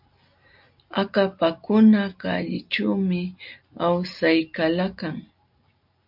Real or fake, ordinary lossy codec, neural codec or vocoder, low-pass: real; MP3, 48 kbps; none; 5.4 kHz